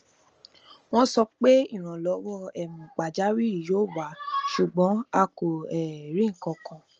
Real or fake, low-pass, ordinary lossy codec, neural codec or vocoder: real; 7.2 kHz; Opus, 24 kbps; none